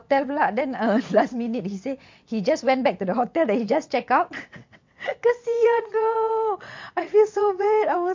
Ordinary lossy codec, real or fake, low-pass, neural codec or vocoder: MP3, 48 kbps; fake; 7.2 kHz; vocoder, 22.05 kHz, 80 mel bands, WaveNeXt